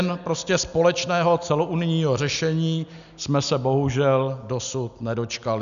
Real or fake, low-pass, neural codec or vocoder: real; 7.2 kHz; none